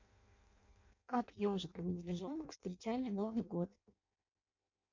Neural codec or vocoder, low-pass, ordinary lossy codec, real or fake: codec, 16 kHz in and 24 kHz out, 0.6 kbps, FireRedTTS-2 codec; 7.2 kHz; AAC, 48 kbps; fake